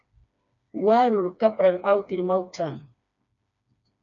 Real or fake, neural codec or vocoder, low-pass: fake; codec, 16 kHz, 2 kbps, FreqCodec, smaller model; 7.2 kHz